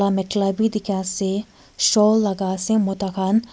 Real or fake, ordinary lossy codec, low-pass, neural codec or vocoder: real; none; none; none